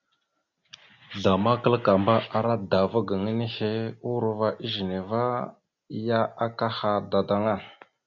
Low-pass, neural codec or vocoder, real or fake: 7.2 kHz; none; real